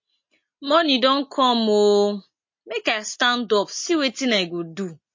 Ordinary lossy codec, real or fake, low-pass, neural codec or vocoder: MP3, 32 kbps; real; 7.2 kHz; none